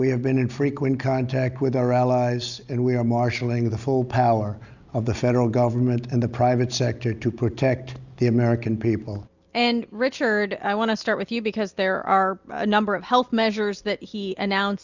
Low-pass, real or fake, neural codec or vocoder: 7.2 kHz; real; none